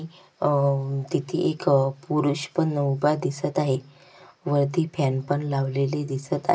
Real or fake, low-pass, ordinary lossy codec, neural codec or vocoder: real; none; none; none